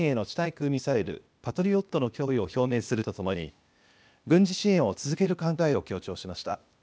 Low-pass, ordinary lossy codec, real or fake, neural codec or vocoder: none; none; fake; codec, 16 kHz, 0.8 kbps, ZipCodec